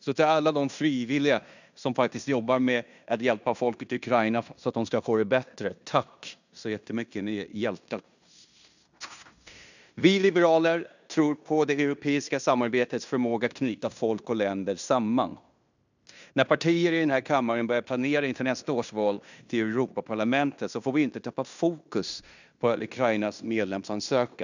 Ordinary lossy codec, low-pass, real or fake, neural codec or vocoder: none; 7.2 kHz; fake; codec, 16 kHz in and 24 kHz out, 0.9 kbps, LongCat-Audio-Codec, fine tuned four codebook decoder